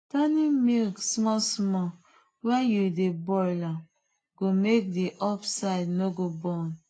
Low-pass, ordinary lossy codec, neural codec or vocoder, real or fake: 9.9 kHz; AAC, 32 kbps; none; real